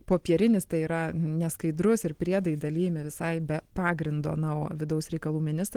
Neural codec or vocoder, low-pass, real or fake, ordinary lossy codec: none; 19.8 kHz; real; Opus, 16 kbps